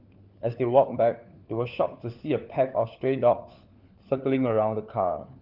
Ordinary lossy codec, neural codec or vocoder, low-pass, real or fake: Opus, 32 kbps; codec, 16 kHz, 4 kbps, FunCodec, trained on LibriTTS, 50 frames a second; 5.4 kHz; fake